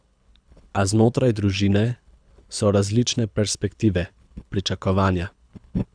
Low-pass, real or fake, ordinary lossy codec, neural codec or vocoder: 9.9 kHz; fake; none; codec, 24 kHz, 6 kbps, HILCodec